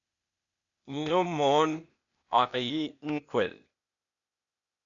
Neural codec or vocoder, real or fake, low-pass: codec, 16 kHz, 0.8 kbps, ZipCodec; fake; 7.2 kHz